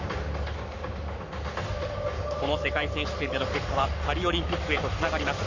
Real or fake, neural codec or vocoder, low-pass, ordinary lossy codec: fake; codec, 44.1 kHz, 7.8 kbps, Pupu-Codec; 7.2 kHz; none